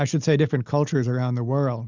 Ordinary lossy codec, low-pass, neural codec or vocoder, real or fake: Opus, 64 kbps; 7.2 kHz; none; real